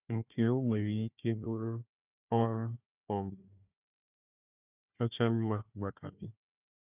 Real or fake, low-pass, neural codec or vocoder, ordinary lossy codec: fake; 3.6 kHz; codec, 16 kHz, 1 kbps, FunCodec, trained on Chinese and English, 50 frames a second; none